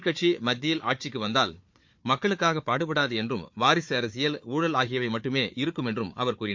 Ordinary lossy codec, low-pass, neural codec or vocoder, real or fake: MP3, 48 kbps; 7.2 kHz; codec, 16 kHz, 4 kbps, FunCodec, trained on Chinese and English, 50 frames a second; fake